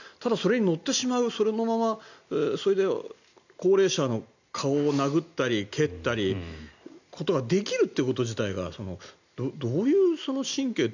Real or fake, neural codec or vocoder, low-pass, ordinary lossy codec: real; none; 7.2 kHz; none